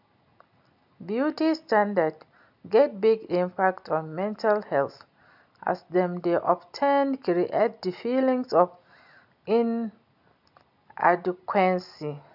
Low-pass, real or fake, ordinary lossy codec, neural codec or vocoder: 5.4 kHz; real; none; none